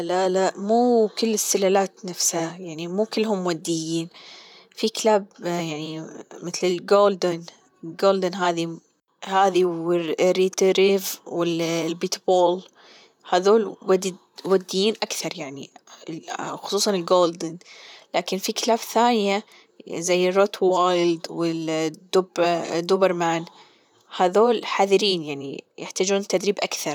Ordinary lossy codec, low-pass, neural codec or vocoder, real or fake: none; 19.8 kHz; vocoder, 44.1 kHz, 128 mel bands, Pupu-Vocoder; fake